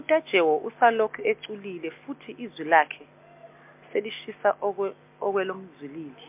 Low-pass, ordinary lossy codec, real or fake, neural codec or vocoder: 3.6 kHz; MP3, 32 kbps; real; none